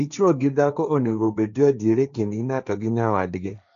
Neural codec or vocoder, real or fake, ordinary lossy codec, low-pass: codec, 16 kHz, 1.1 kbps, Voila-Tokenizer; fake; none; 7.2 kHz